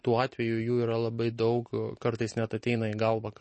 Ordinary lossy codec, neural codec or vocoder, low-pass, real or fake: MP3, 32 kbps; none; 10.8 kHz; real